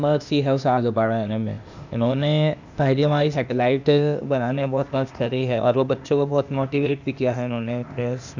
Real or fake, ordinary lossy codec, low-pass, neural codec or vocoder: fake; none; 7.2 kHz; codec, 16 kHz, 0.8 kbps, ZipCodec